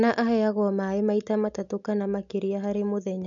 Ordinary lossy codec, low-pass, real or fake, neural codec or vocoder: none; 7.2 kHz; real; none